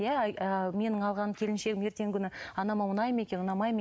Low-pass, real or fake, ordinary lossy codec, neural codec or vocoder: none; real; none; none